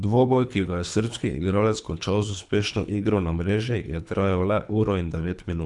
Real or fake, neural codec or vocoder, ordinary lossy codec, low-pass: fake; codec, 24 kHz, 3 kbps, HILCodec; none; 10.8 kHz